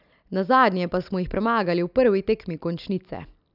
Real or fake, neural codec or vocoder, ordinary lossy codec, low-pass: real; none; none; 5.4 kHz